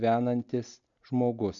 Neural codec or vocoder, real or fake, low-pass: none; real; 7.2 kHz